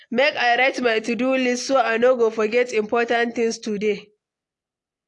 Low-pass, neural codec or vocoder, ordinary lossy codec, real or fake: 10.8 kHz; none; AAC, 48 kbps; real